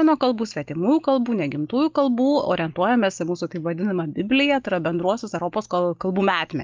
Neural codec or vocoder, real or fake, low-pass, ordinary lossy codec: none; real; 7.2 kHz; Opus, 24 kbps